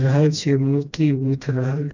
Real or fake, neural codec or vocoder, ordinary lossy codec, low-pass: fake; codec, 16 kHz, 1 kbps, FreqCodec, smaller model; none; 7.2 kHz